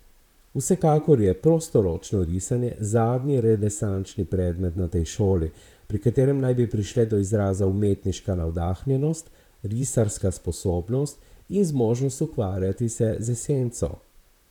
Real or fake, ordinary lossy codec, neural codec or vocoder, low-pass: fake; none; vocoder, 44.1 kHz, 128 mel bands, Pupu-Vocoder; 19.8 kHz